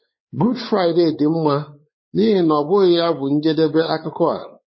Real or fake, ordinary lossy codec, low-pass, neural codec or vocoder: fake; MP3, 24 kbps; 7.2 kHz; codec, 16 kHz, 4 kbps, X-Codec, WavLM features, trained on Multilingual LibriSpeech